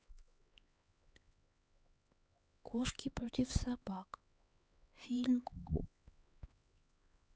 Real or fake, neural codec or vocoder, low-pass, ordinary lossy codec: fake; codec, 16 kHz, 4 kbps, X-Codec, HuBERT features, trained on LibriSpeech; none; none